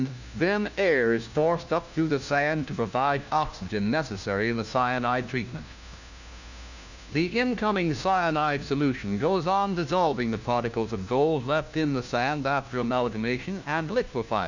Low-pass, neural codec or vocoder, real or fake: 7.2 kHz; codec, 16 kHz, 1 kbps, FunCodec, trained on LibriTTS, 50 frames a second; fake